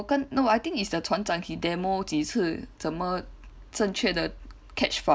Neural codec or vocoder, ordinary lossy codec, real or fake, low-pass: none; none; real; none